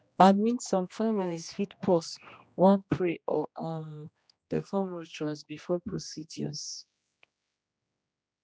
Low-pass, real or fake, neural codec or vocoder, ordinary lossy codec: none; fake; codec, 16 kHz, 1 kbps, X-Codec, HuBERT features, trained on general audio; none